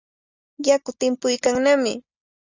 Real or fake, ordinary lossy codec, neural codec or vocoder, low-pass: real; Opus, 64 kbps; none; 7.2 kHz